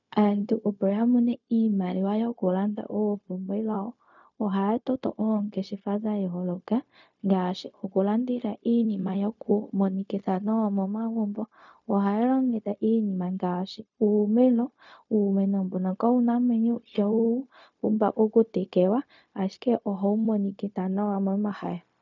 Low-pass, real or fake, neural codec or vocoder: 7.2 kHz; fake; codec, 16 kHz, 0.4 kbps, LongCat-Audio-Codec